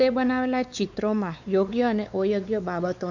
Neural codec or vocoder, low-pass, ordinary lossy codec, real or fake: codec, 16 kHz, 4 kbps, X-Codec, WavLM features, trained on Multilingual LibriSpeech; 7.2 kHz; none; fake